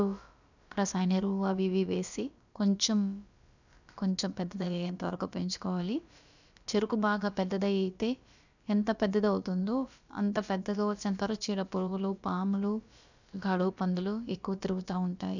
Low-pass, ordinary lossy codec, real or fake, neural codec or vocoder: 7.2 kHz; none; fake; codec, 16 kHz, about 1 kbps, DyCAST, with the encoder's durations